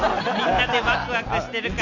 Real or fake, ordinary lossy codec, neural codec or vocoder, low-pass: real; none; none; 7.2 kHz